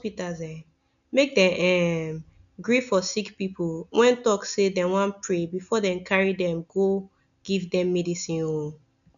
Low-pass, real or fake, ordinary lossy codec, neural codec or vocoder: 7.2 kHz; real; none; none